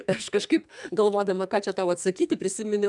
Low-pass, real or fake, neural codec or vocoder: 10.8 kHz; fake; codec, 44.1 kHz, 2.6 kbps, SNAC